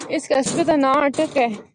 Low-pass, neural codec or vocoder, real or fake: 9.9 kHz; none; real